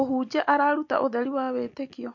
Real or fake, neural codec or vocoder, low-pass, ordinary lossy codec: real; none; 7.2 kHz; MP3, 48 kbps